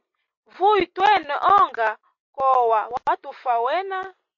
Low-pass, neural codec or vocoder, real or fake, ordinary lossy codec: 7.2 kHz; none; real; MP3, 48 kbps